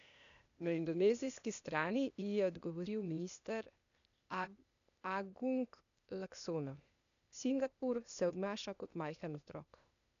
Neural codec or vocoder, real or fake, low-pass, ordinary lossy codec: codec, 16 kHz, 0.8 kbps, ZipCodec; fake; 7.2 kHz; none